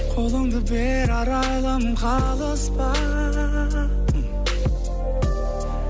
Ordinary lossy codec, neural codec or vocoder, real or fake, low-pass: none; none; real; none